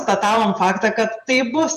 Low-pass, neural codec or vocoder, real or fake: 14.4 kHz; vocoder, 48 kHz, 128 mel bands, Vocos; fake